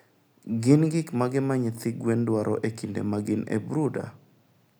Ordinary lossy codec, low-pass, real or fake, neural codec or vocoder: none; none; real; none